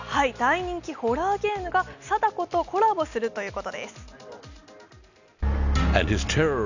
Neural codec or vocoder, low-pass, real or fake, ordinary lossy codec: none; 7.2 kHz; real; none